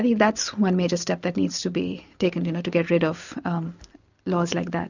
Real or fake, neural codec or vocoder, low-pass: real; none; 7.2 kHz